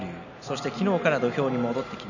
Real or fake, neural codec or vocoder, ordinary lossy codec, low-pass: real; none; none; 7.2 kHz